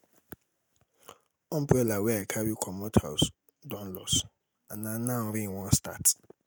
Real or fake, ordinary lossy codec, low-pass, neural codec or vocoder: real; none; none; none